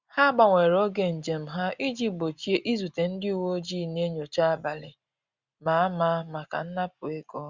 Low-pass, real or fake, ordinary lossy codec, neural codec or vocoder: 7.2 kHz; real; Opus, 64 kbps; none